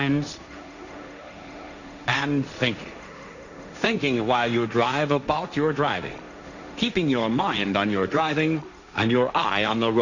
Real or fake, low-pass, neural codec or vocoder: fake; 7.2 kHz; codec, 16 kHz, 1.1 kbps, Voila-Tokenizer